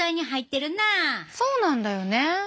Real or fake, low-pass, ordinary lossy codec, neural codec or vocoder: real; none; none; none